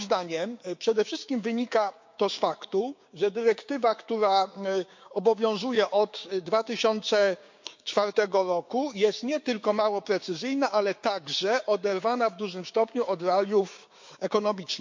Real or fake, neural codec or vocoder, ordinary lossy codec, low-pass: fake; codec, 16 kHz, 6 kbps, DAC; MP3, 48 kbps; 7.2 kHz